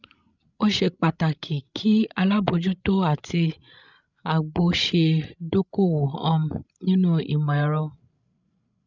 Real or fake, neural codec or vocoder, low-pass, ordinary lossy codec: fake; codec, 16 kHz, 16 kbps, FreqCodec, larger model; 7.2 kHz; none